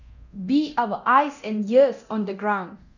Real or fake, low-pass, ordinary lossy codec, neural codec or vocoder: fake; 7.2 kHz; none; codec, 24 kHz, 0.9 kbps, DualCodec